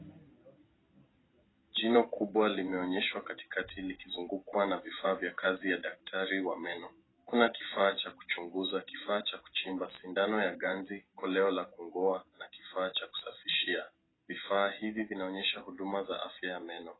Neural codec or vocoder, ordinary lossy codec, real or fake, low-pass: none; AAC, 16 kbps; real; 7.2 kHz